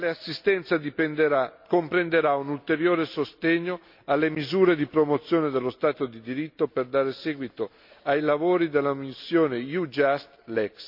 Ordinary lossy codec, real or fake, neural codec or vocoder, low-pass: MP3, 48 kbps; real; none; 5.4 kHz